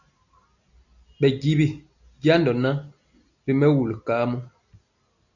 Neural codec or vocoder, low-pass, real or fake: none; 7.2 kHz; real